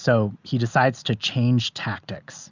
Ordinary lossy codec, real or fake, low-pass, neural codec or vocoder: Opus, 64 kbps; real; 7.2 kHz; none